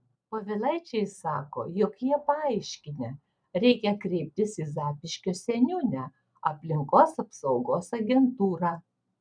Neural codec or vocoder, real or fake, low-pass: none; real; 9.9 kHz